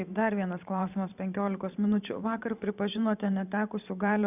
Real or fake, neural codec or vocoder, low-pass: fake; vocoder, 44.1 kHz, 128 mel bands every 512 samples, BigVGAN v2; 3.6 kHz